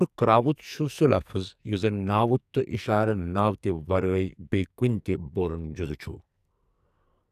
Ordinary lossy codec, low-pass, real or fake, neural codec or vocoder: none; 14.4 kHz; fake; codec, 44.1 kHz, 2.6 kbps, SNAC